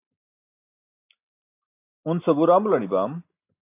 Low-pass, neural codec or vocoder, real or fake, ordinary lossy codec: 3.6 kHz; none; real; AAC, 24 kbps